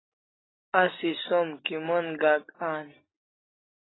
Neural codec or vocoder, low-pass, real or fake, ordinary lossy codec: none; 7.2 kHz; real; AAC, 16 kbps